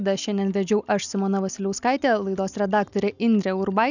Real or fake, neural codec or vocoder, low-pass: real; none; 7.2 kHz